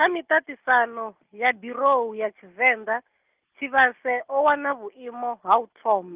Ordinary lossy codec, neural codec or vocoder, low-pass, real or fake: Opus, 24 kbps; none; 3.6 kHz; real